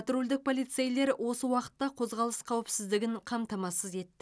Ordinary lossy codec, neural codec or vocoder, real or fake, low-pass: none; none; real; none